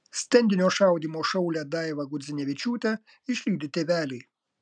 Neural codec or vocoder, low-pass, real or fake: none; 9.9 kHz; real